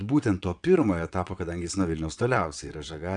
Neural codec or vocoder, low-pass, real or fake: vocoder, 22.05 kHz, 80 mel bands, WaveNeXt; 9.9 kHz; fake